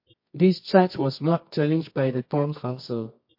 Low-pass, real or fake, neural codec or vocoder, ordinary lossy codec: 5.4 kHz; fake; codec, 24 kHz, 0.9 kbps, WavTokenizer, medium music audio release; MP3, 32 kbps